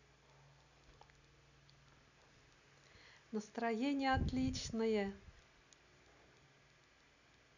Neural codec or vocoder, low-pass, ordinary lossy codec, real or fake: none; 7.2 kHz; none; real